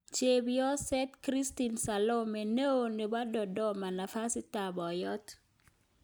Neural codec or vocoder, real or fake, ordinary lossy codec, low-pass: none; real; none; none